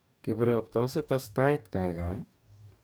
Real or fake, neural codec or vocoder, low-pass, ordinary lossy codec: fake; codec, 44.1 kHz, 2.6 kbps, DAC; none; none